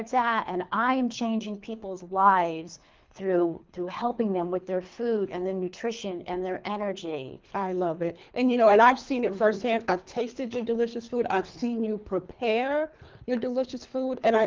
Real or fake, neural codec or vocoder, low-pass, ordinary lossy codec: fake; codec, 24 kHz, 3 kbps, HILCodec; 7.2 kHz; Opus, 32 kbps